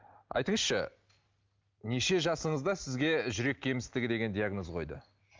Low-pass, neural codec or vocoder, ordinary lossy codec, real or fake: 7.2 kHz; none; Opus, 32 kbps; real